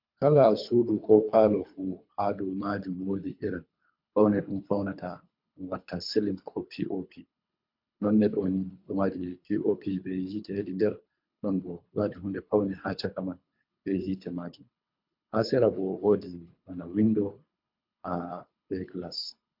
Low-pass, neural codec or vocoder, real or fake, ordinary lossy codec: 5.4 kHz; codec, 24 kHz, 3 kbps, HILCodec; fake; MP3, 48 kbps